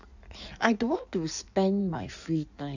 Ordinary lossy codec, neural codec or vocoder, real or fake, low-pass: none; codec, 16 kHz in and 24 kHz out, 1.1 kbps, FireRedTTS-2 codec; fake; 7.2 kHz